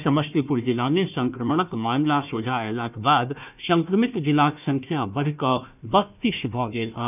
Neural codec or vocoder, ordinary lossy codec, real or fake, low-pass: codec, 16 kHz, 1 kbps, FunCodec, trained on Chinese and English, 50 frames a second; none; fake; 3.6 kHz